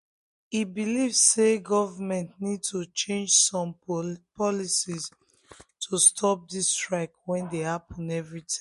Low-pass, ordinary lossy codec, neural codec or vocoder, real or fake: 14.4 kHz; MP3, 48 kbps; none; real